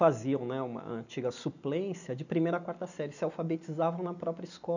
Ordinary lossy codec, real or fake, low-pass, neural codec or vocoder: none; real; 7.2 kHz; none